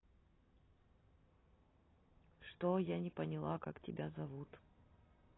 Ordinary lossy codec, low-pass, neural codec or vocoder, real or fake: AAC, 16 kbps; 7.2 kHz; none; real